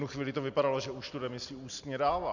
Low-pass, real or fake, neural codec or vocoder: 7.2 kHz; real; none